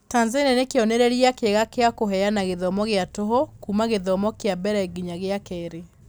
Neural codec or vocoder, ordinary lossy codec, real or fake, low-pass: none; none; real; none